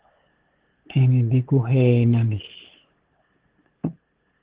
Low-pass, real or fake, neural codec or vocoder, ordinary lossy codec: 3.6 kHz; fake; codec, 16 kHz, 8 kbps, FunCodec, trained on LibriTTS, 25 frames a second; Opus, 16 kbps